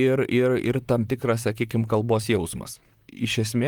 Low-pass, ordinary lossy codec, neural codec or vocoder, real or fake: 19.8 kHz; Opus, 32 kbps; vocoder, 44.1 kHz, 128 mel bands, Pupu-Vocoder; fake